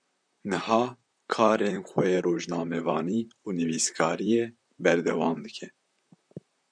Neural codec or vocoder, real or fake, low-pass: vocoder, 44.1 kHz, 128 mel bands, Pupu-Vocoder; fake; 9.9 kHz